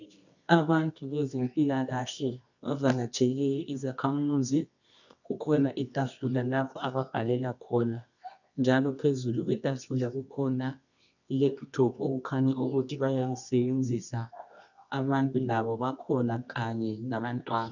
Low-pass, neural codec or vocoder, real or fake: 7.2 kHz; codec, 24 kHz, 0.9 kbps, WavTokenizer, medium music audio release; fake